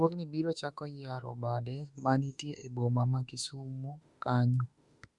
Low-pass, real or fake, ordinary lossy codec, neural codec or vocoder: 10.8 kHz; fake; Opus, 64 kbps; autoencoder, 48 kHz, 32 numbers a frame, DAC-VAE, trained on Japanese speech